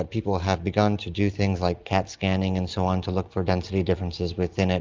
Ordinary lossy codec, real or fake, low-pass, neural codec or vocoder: Opus, 24 kbps; real; 7.2 kHz; none